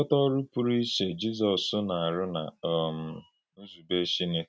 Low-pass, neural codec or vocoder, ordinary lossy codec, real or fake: none; none; none; real